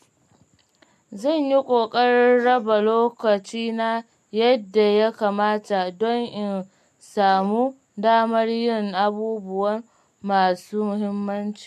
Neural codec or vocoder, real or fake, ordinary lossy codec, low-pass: none; real; MP3, 64 kbps; 14.4 kHz